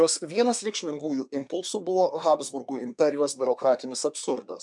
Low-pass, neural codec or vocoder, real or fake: 10.8 kHz; codec, 24 kHz, 1 kbps, SNAC; fake